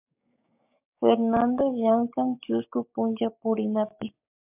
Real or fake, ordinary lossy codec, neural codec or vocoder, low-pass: fake; MP3, 32 kbps; codec, 16 kHz, 6 kbps, DAC; 3.6 kHz